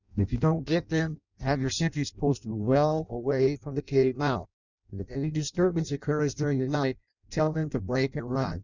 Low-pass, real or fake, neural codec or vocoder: 7.2 kHz; fake; codec, 16 kHz in and 24 kHz out, 0.6 kbps, FireRedTTS-2 codec